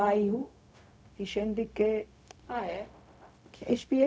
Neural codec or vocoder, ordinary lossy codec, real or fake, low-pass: codec, 16 kHz, 0.4 kbps, LongCat-Audio-Codec; none; fake; none